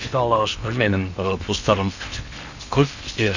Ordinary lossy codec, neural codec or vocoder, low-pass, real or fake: none; codec, 16 kHz in and 24 kHz out, 0.8 kbps, FocalCodec, streaming, 65536 codes; 7.2 kHz; fake